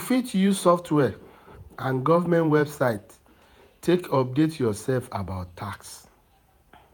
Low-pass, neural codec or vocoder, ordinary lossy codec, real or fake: none; vocoder, 48 kHz, 128 mel bands, Vocos; none; fake